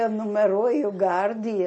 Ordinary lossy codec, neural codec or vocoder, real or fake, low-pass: MP3, 32 kbps; none; real; 10.8 kHz